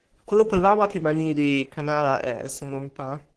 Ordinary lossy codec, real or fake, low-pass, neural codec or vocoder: Opus, 16 kbps; fake; 10.8 kHz; codec, 44.1 kHz, 3.4 kbps, Pupu-Codec